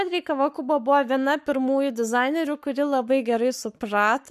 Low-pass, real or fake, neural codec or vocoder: 14.4 kHz; fake; codec, 44.1 kHz, 7.8 kbps, Pupu-Codec